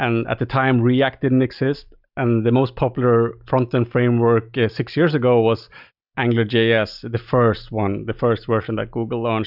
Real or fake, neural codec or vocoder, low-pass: real; none; 5.4 kHz